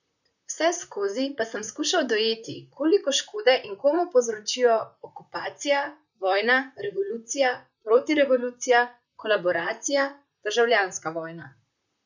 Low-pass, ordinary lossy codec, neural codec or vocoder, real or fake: 7.2 kHz; none; vocoder, 44.1 kHz, 128 mel bands, Pupu-Vocoder; fake